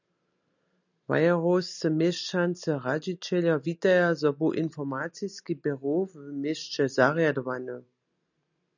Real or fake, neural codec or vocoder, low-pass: real; none; 7.2 kHz